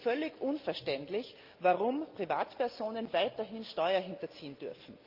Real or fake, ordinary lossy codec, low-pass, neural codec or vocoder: real; Opus, 24 kbps; 5.4 kHz; none